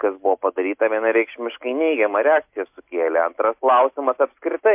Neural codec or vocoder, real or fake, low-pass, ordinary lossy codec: none; real; 3.6 kHz; MP3, 32 kbps